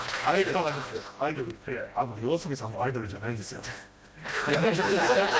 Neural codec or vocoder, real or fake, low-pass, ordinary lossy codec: codec, 16 kHz, 1 kbps, FreqCodec, smaller model; fake; none; none